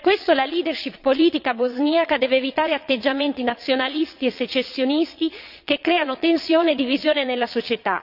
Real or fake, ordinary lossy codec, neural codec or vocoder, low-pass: fake; none; vocoder, 22.05 kHz, 80 mel bands, Vocos; 5.4 kHz